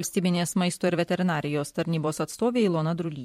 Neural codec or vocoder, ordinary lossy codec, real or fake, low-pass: vocoder, 44.1 kHz, 128 mel bands every 512 samples, BigVGAN v2; MP3, 64 kbps; fake; 19.8 kHz